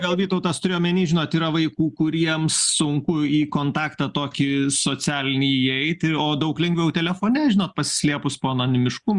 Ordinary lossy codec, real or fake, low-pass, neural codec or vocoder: Opus, 64 kbps; real; 10.8 kHz; none